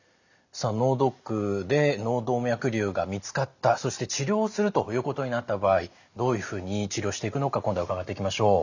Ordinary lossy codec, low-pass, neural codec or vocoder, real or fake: none; 7.2 kHz; none; real